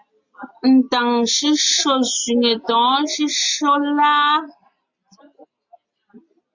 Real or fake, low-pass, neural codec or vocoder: real; 7.2 kHz; none